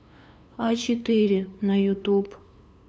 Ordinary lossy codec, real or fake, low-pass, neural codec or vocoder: none; fake; none; codec, 16 kHz, 2 kbps, FunCodec, trained on LibriTTS, 25 frames a second